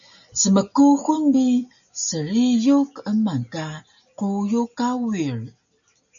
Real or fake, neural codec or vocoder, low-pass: real; none; 7.2 kHz